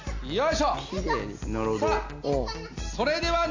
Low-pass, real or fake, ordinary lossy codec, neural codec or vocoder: 7.2 kHz; real; AAC, 48 kbps; none